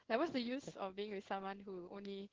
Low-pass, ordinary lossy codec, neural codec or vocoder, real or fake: 7.2 kHz; Opus, 16 kbps; codec, 16 kHz in and 24 kHz out, 1 kbps, XY-Tokenizer; fake